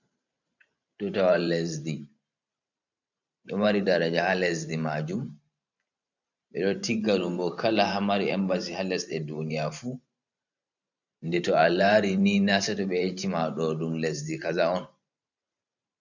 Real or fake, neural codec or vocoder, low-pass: fake; vocoder, 44.1 kHz, 128 mel bands every 512 samples, BigVGAN v2; 7.2 kHz